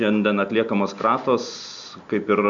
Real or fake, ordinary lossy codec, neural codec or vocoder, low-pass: real; MP3, 64 kbps; none; 7.2 kHz